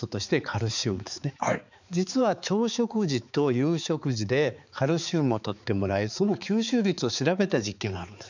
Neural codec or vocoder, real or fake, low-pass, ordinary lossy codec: codec, 16 kHz, 4 kbps, X-Codec, HuBERT features, trained on balanced general audio; fake; 7.2 kHz; none